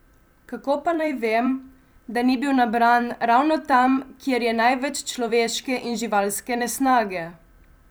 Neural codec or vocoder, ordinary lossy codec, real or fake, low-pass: vocoder, 44.1 kHz, 128 mel bands every 256 samples, BigVGAN v2; none; fake; none